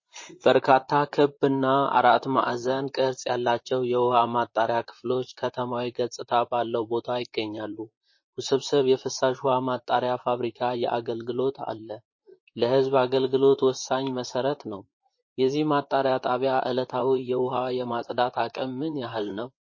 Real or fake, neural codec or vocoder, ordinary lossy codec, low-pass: fake; vocoder, 44.1 kHz, 128 mel bands, Pupu-Vocoder; MP3, 32 kbps; 7.2 kHz